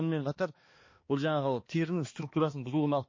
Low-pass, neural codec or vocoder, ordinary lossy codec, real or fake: 7.2 kHz; codec, 16 kHz, 2 kbps, X-Codec, HuBERT features, trained on balanced general audio; MP3, 32 kbps; fake